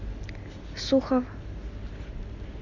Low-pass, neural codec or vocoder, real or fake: 7.2 kHz; none; real